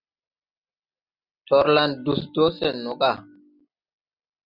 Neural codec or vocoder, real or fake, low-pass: none; real; 5.4 kHz